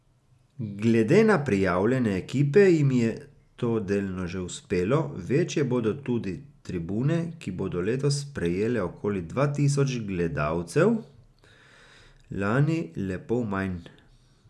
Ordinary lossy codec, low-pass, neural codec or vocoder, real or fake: none; none; none; real